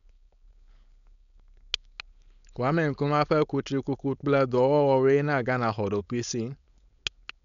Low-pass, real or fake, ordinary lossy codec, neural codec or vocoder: 7.2 kHz; fake; none; codec, 16 kHz, 4.8 kbps, FACodec